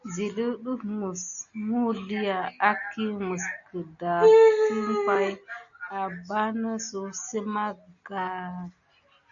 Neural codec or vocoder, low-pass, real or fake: none; 7.2 kHz; real